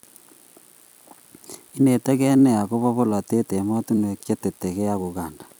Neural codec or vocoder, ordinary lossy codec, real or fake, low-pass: vocoder, 44.1 kHz, 128 mel bands every 256 samples, BigVGAN v2; none; fake; none